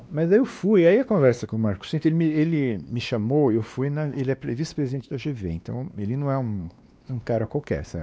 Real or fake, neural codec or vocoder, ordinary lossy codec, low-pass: fake; codec, 16 kHz, 2 kbps, X-Codec, WavLM features, trained on Multilingual LibriSpeech; none; none